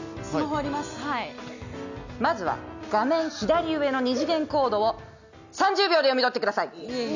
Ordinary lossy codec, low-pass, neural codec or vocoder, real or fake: none; 7.2 kHz; none; real